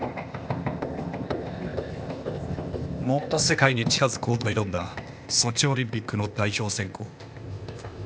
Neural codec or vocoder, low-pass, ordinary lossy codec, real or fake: codec, 16 kHz, 0.8 kbps, ZipCodec; none; none; fake